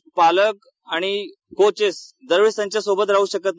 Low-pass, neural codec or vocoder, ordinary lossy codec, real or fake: none; none; none; real